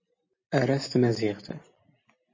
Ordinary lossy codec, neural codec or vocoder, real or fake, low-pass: MP3, 32 kbps; vocoder, 44.1 kHz, 128 mel bands every 256 samples, BigVGAN v2; fake; 7.2 kHz